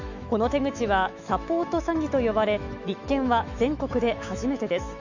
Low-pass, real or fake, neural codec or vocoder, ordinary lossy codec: 7.2 kHz; fake; autoencoder, 48 kHz, 128 numbers a frame, DAC-VAE, trained on Japanese speech; none